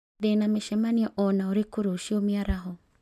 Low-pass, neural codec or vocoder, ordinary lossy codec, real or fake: 14.4 kHz; none; MP3, 96 kbps; real